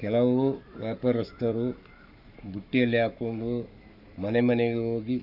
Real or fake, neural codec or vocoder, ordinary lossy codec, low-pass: fake; codec, 44.1 kHz, 7.8 kbps, Pupu-Codec; none; 5.4 kHz